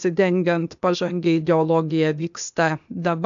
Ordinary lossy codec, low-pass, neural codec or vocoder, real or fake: MP3, 64 kbps; 7.2 kHz; codec, 16 kHz, 0.8 kbps, ZipCodec; fake